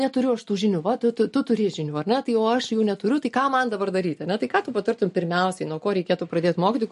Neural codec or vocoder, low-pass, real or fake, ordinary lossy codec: none; 14.4 kHz; real; MP3, 48 kbps